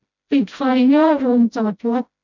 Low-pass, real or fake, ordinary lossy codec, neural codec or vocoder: 7.2 kHz; fake; none; codec, 16 kHz, 0.5 kbps, FreqCodec, smaller model